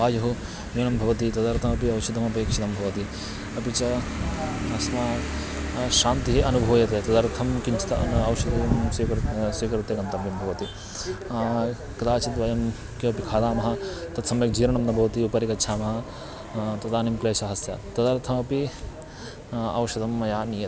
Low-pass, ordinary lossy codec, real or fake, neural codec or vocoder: none; none; real; none